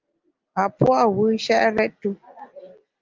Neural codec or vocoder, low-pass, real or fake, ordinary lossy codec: none; 7.2 kHz; real; Opus, 32 kbps